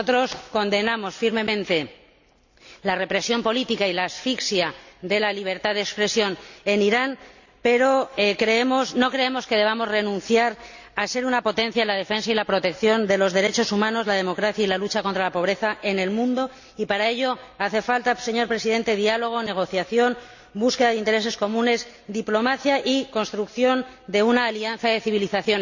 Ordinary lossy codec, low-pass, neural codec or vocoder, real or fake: none; 7.2 kHz; none; real